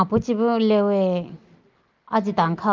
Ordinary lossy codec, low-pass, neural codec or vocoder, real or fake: Opus, 32 kbps; 7.2 kHz; none; real